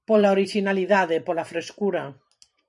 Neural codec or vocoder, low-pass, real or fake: vocoder, 44.1 kHz, 128 mel bands every 512 samples, BigVGAN v2; 10.8 kHz; fake